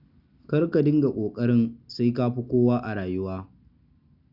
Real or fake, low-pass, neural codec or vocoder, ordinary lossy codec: real; 5.4 kHz; none; none